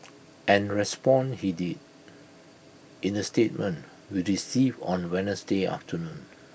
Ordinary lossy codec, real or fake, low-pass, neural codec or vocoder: none; real; none; none